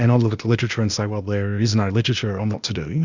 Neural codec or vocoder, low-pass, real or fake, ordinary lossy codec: codec, 16 kHz, 0.8 kbps, ZipCodec; 7.2 kHz; fake; Opus, 64 kbps